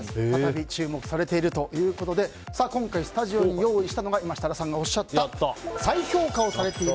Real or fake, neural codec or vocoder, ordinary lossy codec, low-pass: real; none; none; none